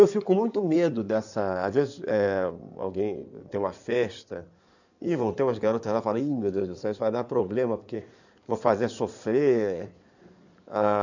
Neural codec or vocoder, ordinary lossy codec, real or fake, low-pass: codec, 16 kHz in and 24 kHz out, 2.2 kbps, FireRedTTS-2 codec; none; fake; 7.2 kHz